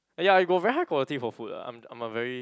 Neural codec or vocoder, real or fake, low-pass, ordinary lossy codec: none; real; none; none